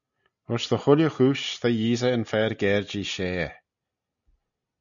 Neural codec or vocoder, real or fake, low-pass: none; real; 7.2 kHz